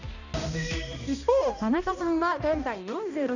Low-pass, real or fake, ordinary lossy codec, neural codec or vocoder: 7.2 kHz; fake; none; codec, 16 kHz, 1 kbps, X-Codec, HuBERT features, trained on balanced general audio